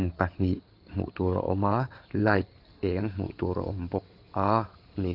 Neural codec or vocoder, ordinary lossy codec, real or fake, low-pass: codec, 16 kHz in and 24 kHz out, 2.2 kbps, FireRedTTS-2 codec; Opus, 32 kbps; fake; 5.4 kHz